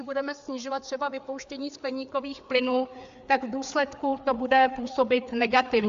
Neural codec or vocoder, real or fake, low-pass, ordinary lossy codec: codec, 16 kHz, 4 kbps, FreqCodec, larger model; fake; 7.2 kHz; AAC, 64 kbps